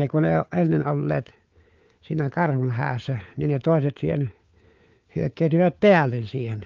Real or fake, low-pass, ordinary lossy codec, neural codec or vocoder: fake; 7.2 kHz; Opus, 24 kbps; codec, 16 kHz, 4 kbps, FunCodec, trained on LibriTTS, 50 frames a second